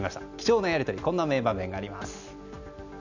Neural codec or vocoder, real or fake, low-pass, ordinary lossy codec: none; real; 7.2 kHz; none